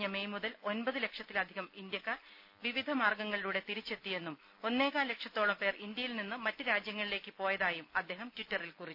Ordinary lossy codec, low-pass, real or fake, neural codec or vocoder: none; 5.4 kHz; real; none